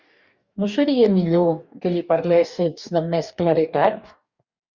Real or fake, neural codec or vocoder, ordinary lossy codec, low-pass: fake; codec, 44.1 kHz, 2.6 kbps, DAC; Opus, 64 kbps; 7.2 kHz